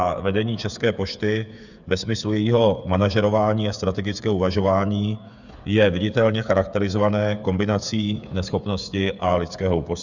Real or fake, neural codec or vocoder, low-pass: fake; codec, 16 kHz, 8 kbps, FreqCodec, smaller model; 7.2 kHz